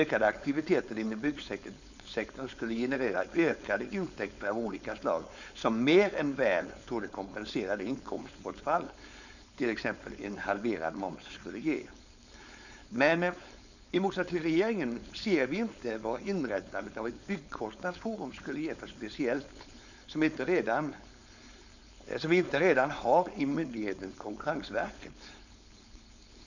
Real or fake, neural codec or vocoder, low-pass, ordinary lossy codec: fake; codec, 16 kHz, 4.8 kbps, FACodec; 7.2 kHz; none